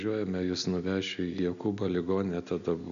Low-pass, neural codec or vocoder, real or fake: 7.2 kHz; none; real